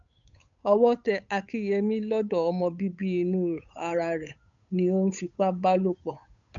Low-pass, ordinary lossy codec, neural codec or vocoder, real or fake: 7.2 kHz; none; codec, 16 kHz, 8 kbps, FunCodec, trained on Chinese and English, 25 frames a second; fake